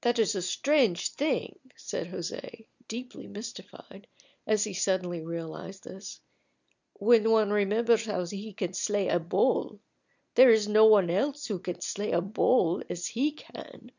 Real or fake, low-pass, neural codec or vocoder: real; 7.2 kHz; none